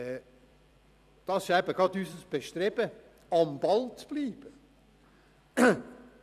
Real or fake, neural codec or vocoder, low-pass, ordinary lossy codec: fake; vocoder, 44.1 kHz, 128 mel bands every 256 samples, BigVGAN v2; 14.4 kHz; none